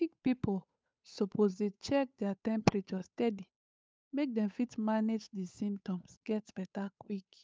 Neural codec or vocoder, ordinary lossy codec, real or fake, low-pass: codec, 16 kHz, 8 kbps, FunCodec, trained on Chinese and English, 25 frames a second; none; fake; none